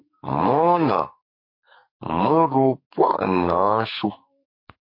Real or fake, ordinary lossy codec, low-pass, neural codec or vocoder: fake; MP3, 32 kbps; 5.4 kHz; codec, 44.1 kHz, 2.6 kbps, SNAC